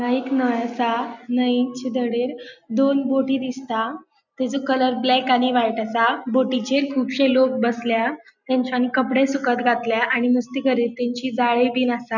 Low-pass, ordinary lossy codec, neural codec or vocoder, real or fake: 7.2 kHz; none; none; real